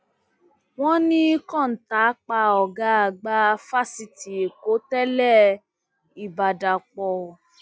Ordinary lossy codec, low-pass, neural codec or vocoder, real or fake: none; none; none; real